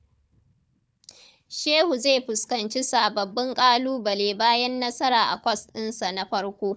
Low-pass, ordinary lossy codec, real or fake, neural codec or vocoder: none; none; fake; codec, 16 kHz, 4 kbps, FunCodec, trained on Chinese and English, 50 frames a second